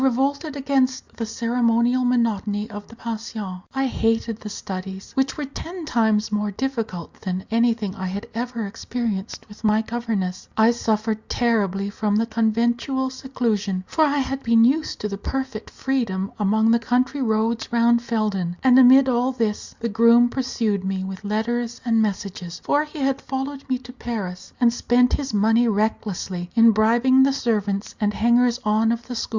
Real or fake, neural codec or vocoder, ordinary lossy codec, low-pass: real; none; Opus, 64 kbps; 7.2 kHz